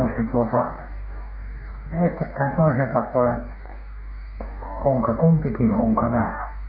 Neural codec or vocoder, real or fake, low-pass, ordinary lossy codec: codec, 44.1 kHz, 2.6 kbps, DAC; fake; 5.4 kHz; none